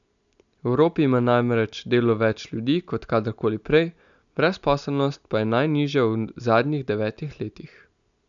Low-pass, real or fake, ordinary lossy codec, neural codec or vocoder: 7.2 kHz; real; none; none